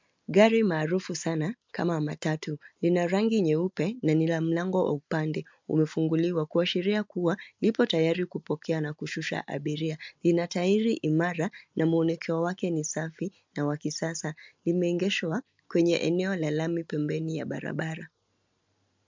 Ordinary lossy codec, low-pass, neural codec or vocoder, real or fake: MP3, 64 kbps; 7.2 kHz; none; real